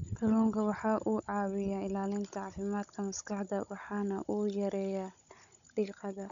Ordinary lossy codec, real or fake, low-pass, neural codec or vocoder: none; fake; 7.2 kHz; codec, 16 kHz, 16 kbps, FunCodec, trained on Chinese and English, 50 frames a second